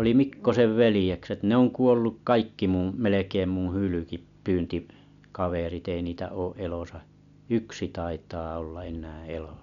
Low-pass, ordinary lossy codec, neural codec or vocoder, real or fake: 7.2 kHz; none; none; real